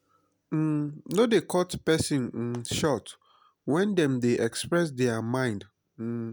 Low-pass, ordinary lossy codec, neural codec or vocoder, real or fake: none; none; none; real